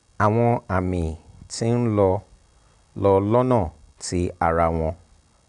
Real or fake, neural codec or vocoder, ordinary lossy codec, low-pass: real; none; none; 10.8 kHz